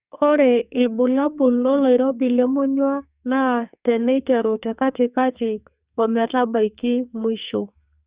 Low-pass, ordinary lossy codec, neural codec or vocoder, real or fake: 3.6 kHz; Opus, 64 kbps; codec, 44.1 kHz, 2.6 kbps, SNAC; fake